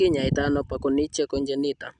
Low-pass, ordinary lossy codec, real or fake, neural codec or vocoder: 9.9 kHz; none; real; none